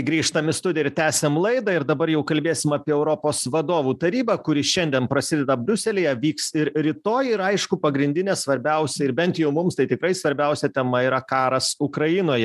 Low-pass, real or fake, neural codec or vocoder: 14.4 kHz; real; none